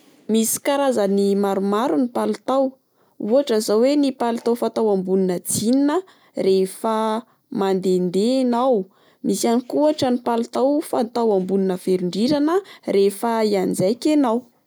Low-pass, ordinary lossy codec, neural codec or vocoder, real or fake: none; none; none; real